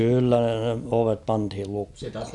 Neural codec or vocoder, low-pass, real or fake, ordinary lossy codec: none; 10.8 kHz; real; none